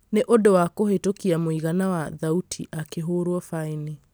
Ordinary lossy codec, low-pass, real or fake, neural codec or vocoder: none; none; real; none